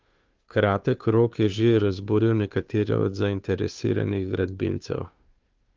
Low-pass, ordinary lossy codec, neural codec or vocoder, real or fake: 7.2 kHz; Opus, 24 kbps; codec, 16 kHz, 2 kbps, FunCodec, trained on Chinese and English, 25 frames a second; fake